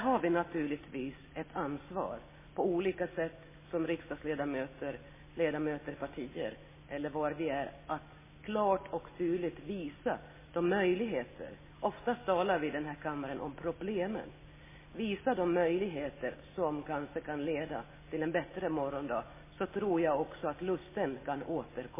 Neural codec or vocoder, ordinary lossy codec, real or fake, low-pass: none; MP3, 16 kbps; real; 3.6 kHz